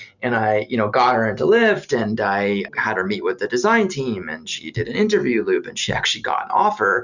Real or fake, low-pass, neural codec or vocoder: real; 7.2 kHz; none